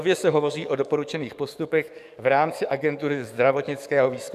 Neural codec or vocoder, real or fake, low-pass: codec, 44.1 kHz, 7.8 kbps, DAC; fake; 14.4 kHz